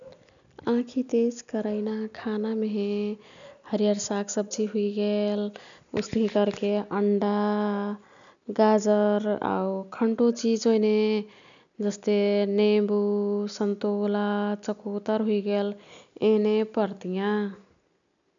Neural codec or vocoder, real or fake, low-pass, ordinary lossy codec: none; real; 7.2 kHz; none